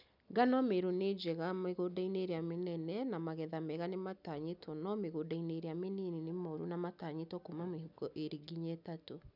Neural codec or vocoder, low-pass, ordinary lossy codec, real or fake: none; 5.4 kHz; none; real